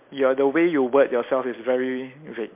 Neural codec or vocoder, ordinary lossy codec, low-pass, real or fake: none; MP3, 32 kbps; 3.6 kHz; real